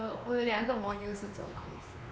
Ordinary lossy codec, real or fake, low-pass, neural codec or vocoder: none; fake; none; codec, 16 kHz, 2 kbps, X-Codec, WavLM features, trained on Multilingual LibriSpeech